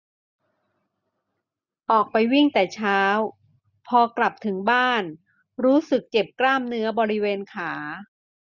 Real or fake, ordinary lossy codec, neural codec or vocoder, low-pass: real; none; none; 7.2 kHz